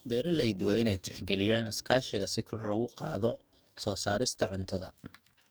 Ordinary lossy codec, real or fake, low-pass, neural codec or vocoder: none; fake; none; codec, 44.1 kHz, 2.6 kbps, DAC